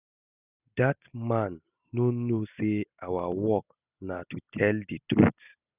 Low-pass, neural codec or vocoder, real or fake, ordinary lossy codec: 3.6 kHz; none; real; none